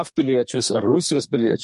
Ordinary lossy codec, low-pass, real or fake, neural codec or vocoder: MP3, 48 kbps; 14.4 kHz; fake; codec, 32 kHz, 1.9 kbps, SNAC